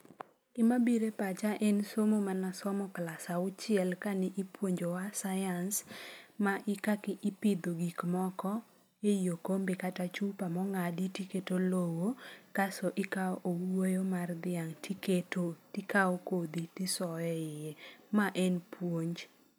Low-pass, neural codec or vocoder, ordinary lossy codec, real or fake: none; none; none; real